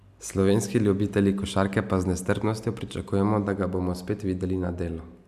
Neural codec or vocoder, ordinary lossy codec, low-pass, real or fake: none; none; 14.4 kHz; real